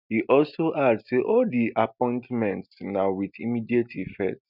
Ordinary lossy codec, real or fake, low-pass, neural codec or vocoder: none; real; 5.4 kHz; none